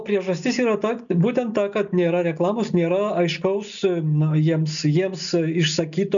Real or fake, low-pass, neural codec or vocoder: real; 7.2 kHz; none